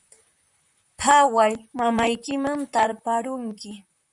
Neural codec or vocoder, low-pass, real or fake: vocoder, 44.1 kHz, 128 mel bands, Pupu-Vocoder; 10.8 kHz; fake